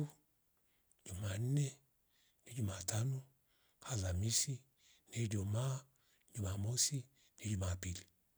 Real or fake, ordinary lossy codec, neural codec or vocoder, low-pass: real; none; none; none